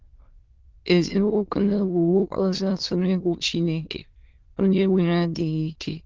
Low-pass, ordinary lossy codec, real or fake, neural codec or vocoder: 7.2 kHz; Opus, 16 kbps; fake; autoencoder, 22.05 kHz, a latent of 192 numbers a frame, VITS, trained on many speakers